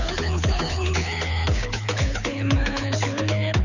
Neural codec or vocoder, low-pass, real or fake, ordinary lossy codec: codec, 24 kHz, 6 kbps, HILCodec; 7.2 kHz; fake; none